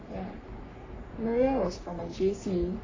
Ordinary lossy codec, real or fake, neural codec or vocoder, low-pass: AAC, 32 kbps; fake; codec, 44.1 kHz, 3.4 kbps, Pupu-Codec; 7.2 kHz